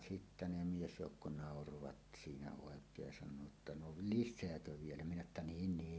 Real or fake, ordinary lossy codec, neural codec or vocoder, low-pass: real; none; none; none